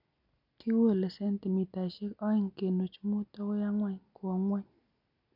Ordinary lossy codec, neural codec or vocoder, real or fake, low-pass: none; none; real; 5.4 kHz